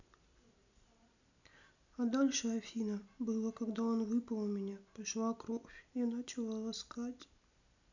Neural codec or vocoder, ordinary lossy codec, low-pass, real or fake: none; none; 7.2 kHz; real